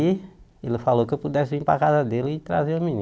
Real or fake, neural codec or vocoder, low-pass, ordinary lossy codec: real; none; none; none